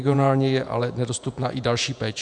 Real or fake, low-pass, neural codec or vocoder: fake; 10.8 kHz; vocoder, 48 kHz, 128 mel bands, Vocos